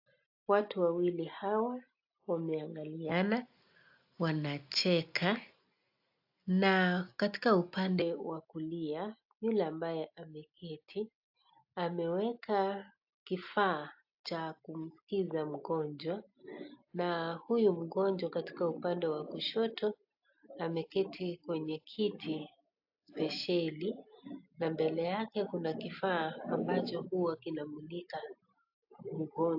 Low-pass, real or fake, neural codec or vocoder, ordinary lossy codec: 5.4 kHz; real; none; AAC, 48 kbps